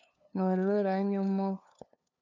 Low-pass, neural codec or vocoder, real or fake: 7.2 kHz; codec, 16 kHz, 2 kbps, FunCodec, trained on LibriTTS, 25 frames a second; fake